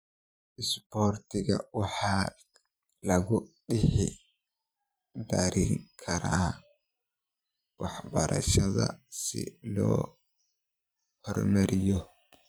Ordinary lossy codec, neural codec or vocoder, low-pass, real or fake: none; vocoder, 44.1 kHz, 128 mel bands every 512 samples, BigVGAN v2; none; fake